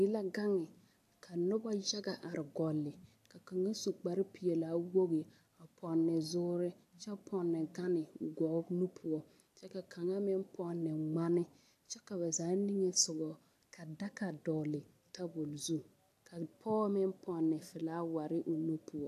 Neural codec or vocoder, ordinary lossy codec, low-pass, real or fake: none; AAC, 64 kbps; 14.4 kHz; real